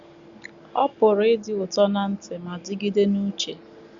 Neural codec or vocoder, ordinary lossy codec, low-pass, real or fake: none; Opus, 64 kbps; 7.2 kHz; real